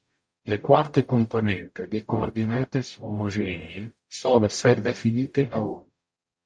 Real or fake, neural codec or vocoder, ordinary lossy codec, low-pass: fake; codec, 44.1 kHz, 0.9 kbps, DAC; MP3, 48 kbps; 9.9 kHz